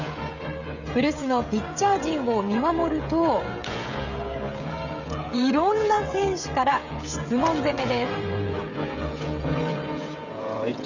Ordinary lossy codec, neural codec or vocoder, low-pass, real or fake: none; codec, 16 kHz, 16 kbps, FreqCodec, smaller model; 7.2 kHz; fake